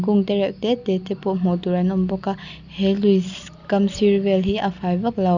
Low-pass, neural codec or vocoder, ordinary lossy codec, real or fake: 7.2 kHz; none; none; real